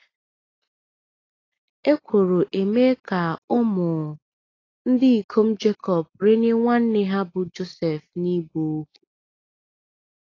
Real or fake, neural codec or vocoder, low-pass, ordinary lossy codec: real; none; 7.2 kHz; AAC, 32 kbps